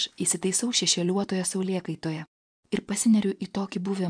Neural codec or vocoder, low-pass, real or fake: vocoder, 24 kHz, 100 mel bands, Vocos; 9.9 kHz; fake